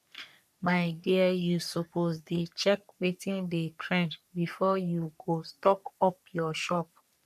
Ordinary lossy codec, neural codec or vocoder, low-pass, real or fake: none; codec, 44.1 kHz, 3.4 kbps, Pupu-Codec; 14.4 kHz; fake